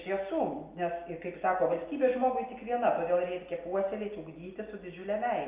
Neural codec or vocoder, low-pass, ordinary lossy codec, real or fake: none; 3.6 kHz; Opus, 24 kbps; real